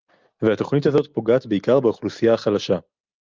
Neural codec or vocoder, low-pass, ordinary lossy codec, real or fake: none; 7.2 kHz; Opus, 24 kbps; real